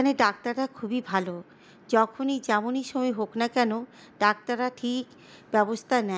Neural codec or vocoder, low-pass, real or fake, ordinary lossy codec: none; none; real; none